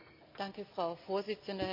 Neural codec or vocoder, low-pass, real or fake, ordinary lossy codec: none; 5.4 kHz; real; MP3, 48 kbps